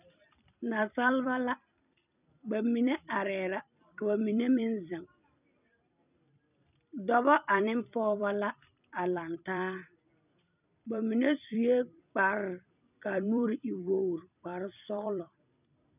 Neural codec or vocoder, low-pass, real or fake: vocoder, 44.1 kHz, 128 mel bands every 256 samples, BigVGAN v2; 3.6 kHz; fake